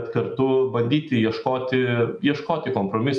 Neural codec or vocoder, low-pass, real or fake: none; 10.8 kHz; real